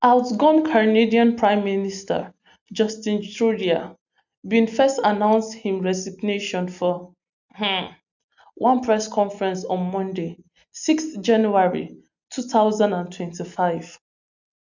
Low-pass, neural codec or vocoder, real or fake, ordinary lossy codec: 7.2 kHz; none; real; none